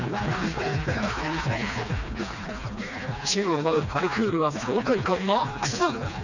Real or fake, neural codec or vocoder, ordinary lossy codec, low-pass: fake; codec, 16 kHz, 2 kbps, FreqCodec, smaller model; none; 7.2 kHz